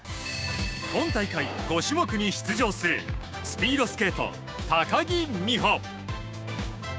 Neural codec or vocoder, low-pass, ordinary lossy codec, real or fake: codec, 16 kHz, 6 kbps, DAC; none; none; fake